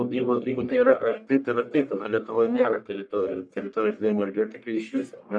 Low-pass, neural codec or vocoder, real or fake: 9.9 kHz; codec, 44.1 kHz, 1.7 kbps, Pupu-Codec; fake